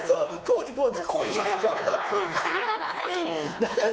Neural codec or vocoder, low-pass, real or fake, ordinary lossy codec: codec, 16 kHz, 2 kbps, X-Codec, WavLM features, trained on Multilingual LibriSpeech; none; fake; none